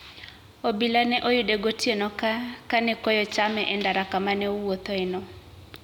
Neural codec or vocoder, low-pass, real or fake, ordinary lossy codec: none; 19.8 kHz; real; none